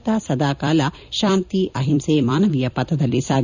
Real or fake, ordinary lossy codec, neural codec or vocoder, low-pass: fake; none; vocoder, 44.1 kHz, 128 mel bands every 256 samples, BigVGAN v2; 7.2 kHz